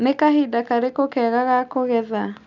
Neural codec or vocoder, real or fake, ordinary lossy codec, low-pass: none; real; none; 7.2 kHz